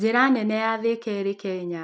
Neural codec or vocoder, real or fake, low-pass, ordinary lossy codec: none; real; none; none